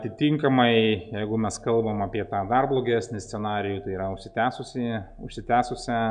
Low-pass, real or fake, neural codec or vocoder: 9.9 kHz; real; none